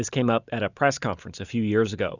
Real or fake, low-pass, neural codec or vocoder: real; 7.2 kHz; none